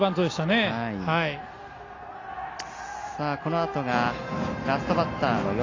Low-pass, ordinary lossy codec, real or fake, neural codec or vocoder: 7.2 kHz; AAC, 32 kbps; real; none